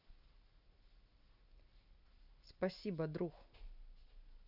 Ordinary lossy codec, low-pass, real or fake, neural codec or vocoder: none; 5.4 kHz; real; none